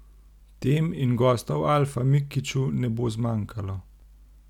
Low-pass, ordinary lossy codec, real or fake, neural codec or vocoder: 19.8 kHz; none; real; none